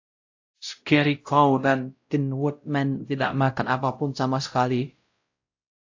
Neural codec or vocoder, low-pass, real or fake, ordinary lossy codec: codec, 16 kHz, 0.5 kbps, X-Codec, WavLM features, trained on Multilingual LibriSpeech; 7.2 kHz; fake; AAC, 48 kbps